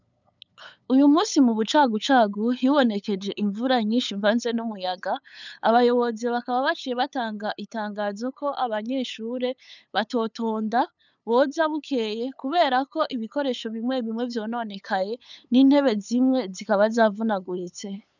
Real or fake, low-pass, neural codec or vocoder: fake; 7.2 kHz; codec, 16 kHz, 8 kbps, FunCodec, trained on LibriTTS, 25 frames a second